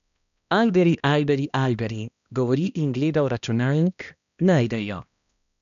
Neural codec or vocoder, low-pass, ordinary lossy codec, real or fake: codec, 16 kHz, 1 kbps, X-Codec, HuBERT features, trained on balanced general audio; 7.2 kHz; none; fake